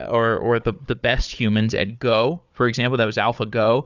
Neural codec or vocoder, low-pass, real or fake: codec, 16 kHz, 4 kbps, FunCodec, trained on Chinese and English, 50 frames a second; 7.2 kHz; fake